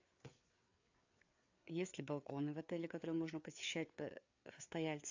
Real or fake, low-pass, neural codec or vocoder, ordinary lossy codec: fake; 7.2 kHz; codec, 16 kHz, 4 kbps, FreqCodec, larger model; AAC, 48 kbps